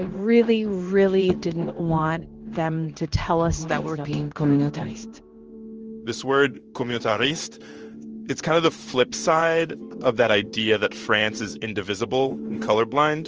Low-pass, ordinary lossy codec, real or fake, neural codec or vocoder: 7.2 kHz; Opus, 24 kbps; fake; codec, 16 kHz in and 24 kHz out, 1 kbps, XY-Tokenizer